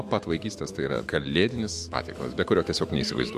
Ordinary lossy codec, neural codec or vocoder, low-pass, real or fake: MP3, 64 kbps; autoencoder, 48 kHz, 128 numbers a frame, DAC-VAE, trained on Japanese speech; 14.4 kHz; fake